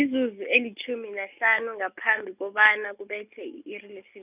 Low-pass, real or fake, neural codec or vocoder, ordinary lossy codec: 3.6 kHz; real; none; none